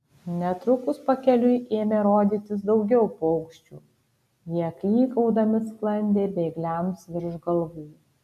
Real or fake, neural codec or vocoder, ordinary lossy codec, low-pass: real; none; AAC, 96 kbps; 14.4 kHz